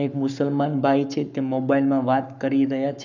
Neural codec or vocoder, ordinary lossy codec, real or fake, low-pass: codec, 44.1 kHz, 7.8 kbps, Pupu-Codec; none; fake; 7.2 kHz